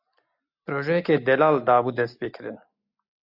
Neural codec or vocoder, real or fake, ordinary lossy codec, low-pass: none; real; AAC, 48 kbps; 5.4 kHz